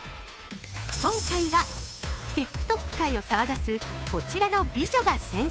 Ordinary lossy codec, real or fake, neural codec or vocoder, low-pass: none; fake; codec, 16 kHz, 2 kbps, FunCodec, trained on Chinese and English, 25 frames a second; none